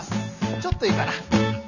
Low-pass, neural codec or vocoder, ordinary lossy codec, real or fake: 7.2 kHz; none; none; real